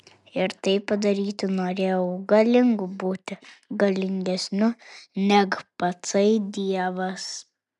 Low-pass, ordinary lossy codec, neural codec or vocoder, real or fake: 10.8 kHz; MP3, 96 kbps; none; real